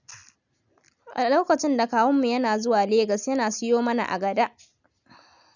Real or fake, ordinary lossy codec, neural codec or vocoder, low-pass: real; none; none; 7.2 kHz